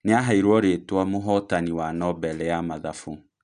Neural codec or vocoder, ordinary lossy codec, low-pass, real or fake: none; none; 9.9 kHz; real